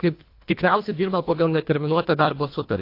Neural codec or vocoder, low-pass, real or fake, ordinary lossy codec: codec, 24 kHz, 1.5 kbps, HILCodec; 5.4 kHz; fake; AAC, 32 kbps